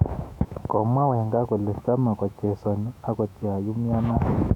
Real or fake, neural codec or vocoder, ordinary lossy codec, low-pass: fake; autoencoder, 48 kHz, 128 numbers a frame, DAC-VAE, trained on Japanese speech; none; 19.8 kHz